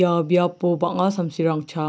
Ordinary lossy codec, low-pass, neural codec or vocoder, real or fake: none; none; none; real